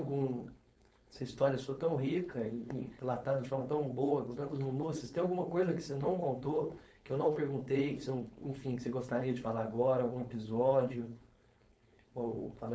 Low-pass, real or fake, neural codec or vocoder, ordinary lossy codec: none; fake; codec, 16 kHz, 4.8 kbps, FACodec; none